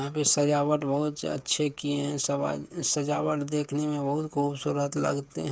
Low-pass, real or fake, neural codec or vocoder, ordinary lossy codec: none; fake; codec, 16 kHz, 8 kbps, FreqCodec, smaller model; none